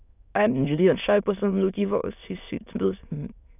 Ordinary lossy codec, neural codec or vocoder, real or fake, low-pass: none; autoencoder, 22.05 kHz, a latent of 192 numbers a frame, VITS, trained on many speakers; fake; 3.6 kHz